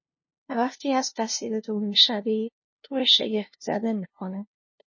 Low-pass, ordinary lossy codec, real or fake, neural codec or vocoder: 7.2 kHz; MP3, 32 kbps; fake; codec, 16 kHz, 0.5 kbps, FunCodec, trained on LibriTTS, 25 frames a second